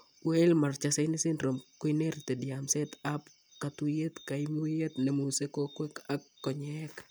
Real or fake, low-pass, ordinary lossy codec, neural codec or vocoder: real; none; none; none